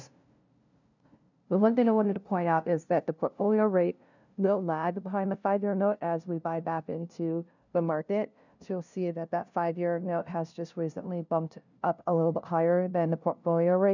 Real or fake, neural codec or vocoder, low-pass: fake; codec, 16 kHz, 0.5 kbps, FunCodec, trained on LibriTTS, 25 frames a second; 7.2 kHz